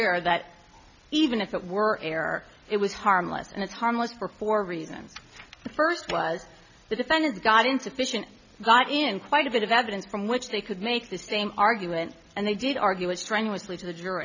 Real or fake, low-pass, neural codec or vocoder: real; 7.2 kHz; none